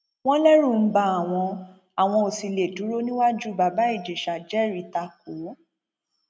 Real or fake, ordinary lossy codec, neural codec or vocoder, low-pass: real; none; none; none